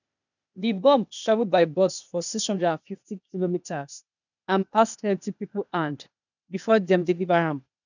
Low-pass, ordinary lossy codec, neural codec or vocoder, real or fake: 7.2 kHz; none; codec, 16 kHz, 0.8 kbps, ZipCodec; fake